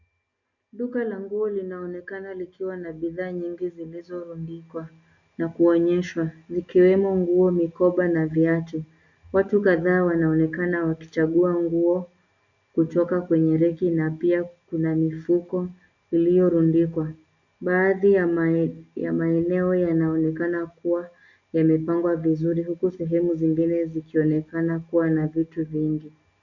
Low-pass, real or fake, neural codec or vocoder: 7.2 kHz; real; none